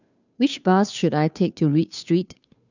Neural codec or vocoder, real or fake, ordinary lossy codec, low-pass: codec, 16 kHz, 2 kbps, FunCodec, trained on Chinese and English, 25 frames a second; fake; none; 7.2 kHz